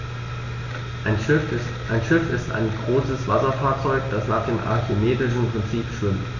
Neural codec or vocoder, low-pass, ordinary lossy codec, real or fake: none; 7.2 kHz; none; real